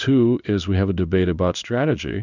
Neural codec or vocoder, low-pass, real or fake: codec, 16 kHz in and 24 kHz out, 1 kbps, XY-Tokenizer; 7.2 kHz; fake